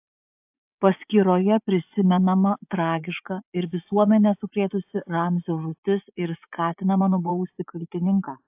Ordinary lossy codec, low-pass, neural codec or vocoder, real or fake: AAC, 32 kbps; 3.6 kHz; vocoder, 24 kHz, 100 mel bands, Vocos; fake